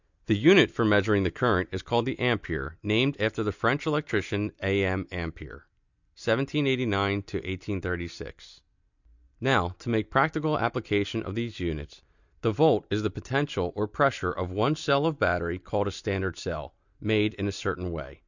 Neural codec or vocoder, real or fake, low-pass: none; real; 7.2 kHz